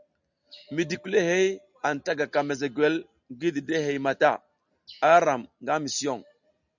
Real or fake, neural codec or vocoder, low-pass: real; none; 7.2 kHz